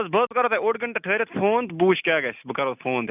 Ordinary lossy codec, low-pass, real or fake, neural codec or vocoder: none; 3.6 kHz; real; none